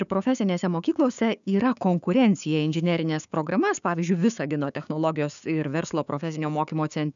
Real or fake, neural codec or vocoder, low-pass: fake; codec, 16 kHz, 6 kbps, DAC; 7.2 kHz